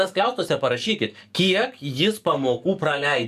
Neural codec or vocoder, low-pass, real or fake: codec, 44.1 kHz, 7.8 kbps, DAC; 14.4 kHz; fake